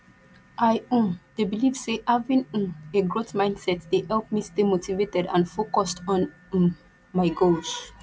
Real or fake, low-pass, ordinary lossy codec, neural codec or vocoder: real; none; none; none